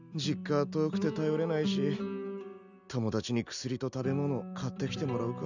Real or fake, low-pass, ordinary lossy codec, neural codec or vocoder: real; 7.2 kHz; none; none